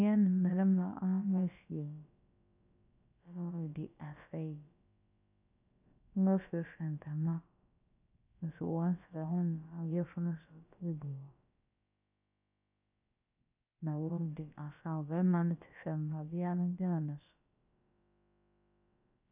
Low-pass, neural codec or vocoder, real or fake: 3.6 kHz; codec, 16 kHz, about 1 kbps, DyCAST, with the encoder's durations; fake